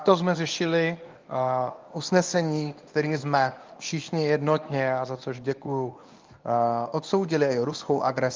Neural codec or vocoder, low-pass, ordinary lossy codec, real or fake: codec, 24 kHz, 0.9 kbps, WavTokenizer, medium speech release version 1; 7.2 kHz; Opus, 24 kbps; fake